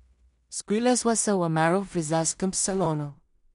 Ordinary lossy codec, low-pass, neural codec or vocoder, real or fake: MP3, 64 kbps; 10.8 kHz; codec, 16 kHz in and 24 kHz out, 0.4 kbps, LongCat-Audio-Codec, two codebook decoder; fake